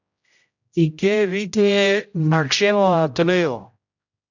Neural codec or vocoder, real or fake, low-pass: codec, 16 kHz, 0.5 kbps, X-Codec, HuBERT features, trained on general audio; fake; 7.2 kHz